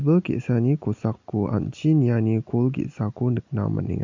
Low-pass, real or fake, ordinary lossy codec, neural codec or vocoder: 7.2 kHz; real; MP3, 48 kbps; none